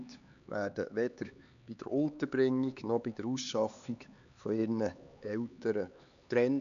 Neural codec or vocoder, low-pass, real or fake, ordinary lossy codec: codec, 16 kHz, 4 kbps, X-Codec, HuBERT features, trained on LibriSpeech; 7.2 kHz; fake; none